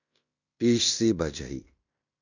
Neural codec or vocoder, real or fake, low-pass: codec, 16 kHz in and 24 kHz out, 0.9 kbps, LongCat-Audio-Codec, fine tuned four codebook decoder; fake; 7.2 kHz